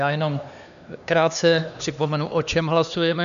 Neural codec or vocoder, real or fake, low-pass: codec, 16 kHz, 2 kbps, X-Codec, HuBERT features, trained on LibriSpeech; fake; 7.2 kHz